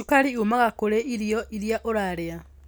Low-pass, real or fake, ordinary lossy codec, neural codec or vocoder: none; real; none; none